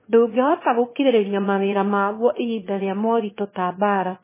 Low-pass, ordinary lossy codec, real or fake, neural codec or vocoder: 3.6 kHz; MP3, 16 kbps; fake; autoencoder, 22.05 kHz, a latent of 192 numbers a frame, VITS, trained on one speaker